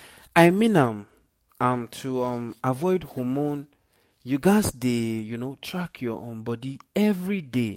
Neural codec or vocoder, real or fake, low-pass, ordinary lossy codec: codec, 44.1 kHz, 7.8 kbps, DAC; fake; 19.8 kHz; MP3, 64 kbps